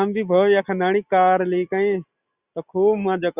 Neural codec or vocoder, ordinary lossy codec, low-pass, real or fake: none; Opus, 64 kbps; 3.6 kHz; real